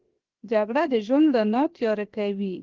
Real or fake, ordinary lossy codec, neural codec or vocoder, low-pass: fake; Opus, 16 kbps; codec, 16 kHz, 0.7 kbps, FocalCodec; 7.2 kHz